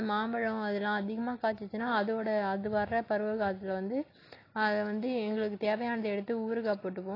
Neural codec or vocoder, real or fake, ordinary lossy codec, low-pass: none; real; AAC, 24 kbps; 5.4 kHz